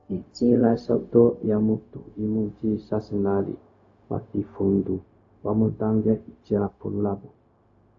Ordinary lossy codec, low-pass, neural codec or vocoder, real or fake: AAC, 48 kbps; 7.2 kHz; codec, 16 kHz, 0.4 kbps, LongCat-Audio-Codec; fake